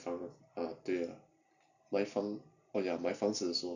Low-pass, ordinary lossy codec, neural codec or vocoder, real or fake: 7.2 kHz; none; vocoder, 44.1 kHz, 128 mel bands every 256 samples, BigVGAN v2; fake